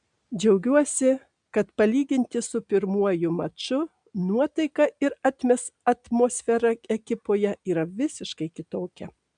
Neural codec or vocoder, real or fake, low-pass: none; real; 9.9 kHz